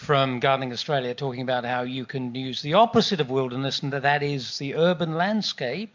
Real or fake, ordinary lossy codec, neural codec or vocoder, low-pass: real; MP3, 64 kbps; none; 7.2 kHz